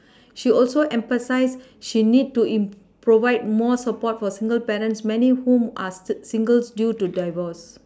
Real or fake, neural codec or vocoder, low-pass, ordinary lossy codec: real; none; none; none